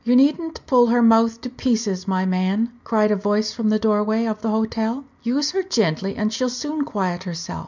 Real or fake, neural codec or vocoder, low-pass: real; none; 7.2 kHz